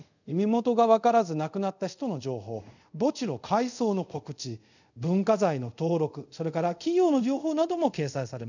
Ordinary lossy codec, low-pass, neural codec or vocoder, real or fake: none; 7.2 kHz; codec, 24 kHz, 0.5 kbps, DualCodec; fake